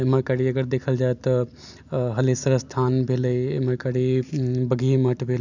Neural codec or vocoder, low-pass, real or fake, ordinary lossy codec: none; 7.2 kHz; real; none